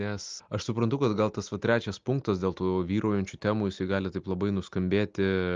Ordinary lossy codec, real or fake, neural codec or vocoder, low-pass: Opus, 24 kbps; real; none; 7.2 kHz